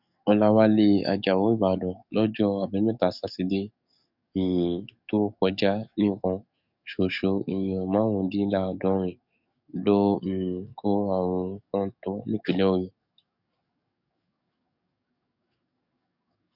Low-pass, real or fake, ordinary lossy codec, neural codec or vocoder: 5.4 kHz; fake; none; codec, 44.1 kHz, 7.8 kbps, DAC